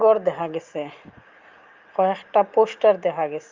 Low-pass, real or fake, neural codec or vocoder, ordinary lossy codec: 7.2 kHz; real; none; Opus, 32 kbps